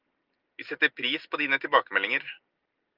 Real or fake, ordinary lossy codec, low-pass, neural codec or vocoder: real; Opus, 24 kbps; 5.4 kHz; none